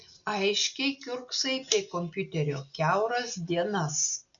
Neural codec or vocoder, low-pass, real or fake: none; 7.2 kHz; real